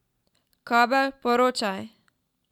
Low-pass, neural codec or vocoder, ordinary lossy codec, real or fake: 19.8 kHz; none; none; real